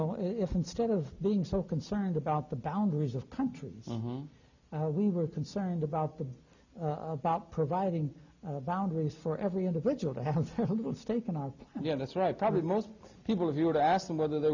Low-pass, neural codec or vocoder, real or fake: 7.2 kHz; none; real